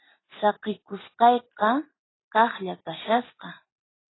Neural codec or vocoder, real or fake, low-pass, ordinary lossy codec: none; real; 7.2 kHz; AAC, 16 kbps